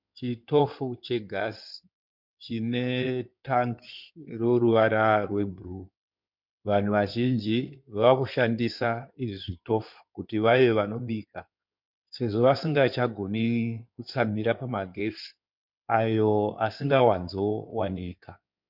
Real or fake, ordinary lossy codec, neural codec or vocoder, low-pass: fake; MP3, 48 kbps; codec, 16 kHz in and 24 kHz out, 2.2 kbps, FireRedTTS-2 codec; 5.4 kHz